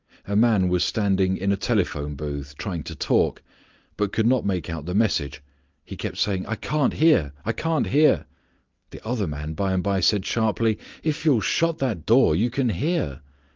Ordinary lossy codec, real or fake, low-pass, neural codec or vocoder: Opus, 24 kbps; real; 7.2 kHz; none